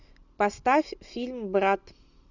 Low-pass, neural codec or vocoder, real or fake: 7.2 kHz; none; real